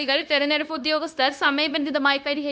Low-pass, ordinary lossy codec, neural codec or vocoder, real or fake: none; none; codec, 16 kHz, 0.9 kbps, LongCat-Audio-Codec; fake